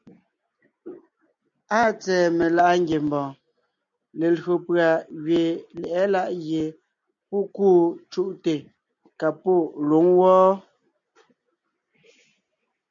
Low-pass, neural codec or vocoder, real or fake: 7.2 kHz; none; real